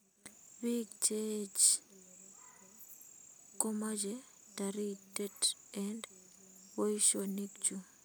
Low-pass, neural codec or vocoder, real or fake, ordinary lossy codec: none; none; real; none